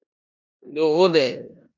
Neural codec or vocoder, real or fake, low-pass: codec, 16 kHz in and 24 kHz out, 0.9 kbps, LongCat-Audio-Codec, four codebook decoder; fake; 7.2 kHz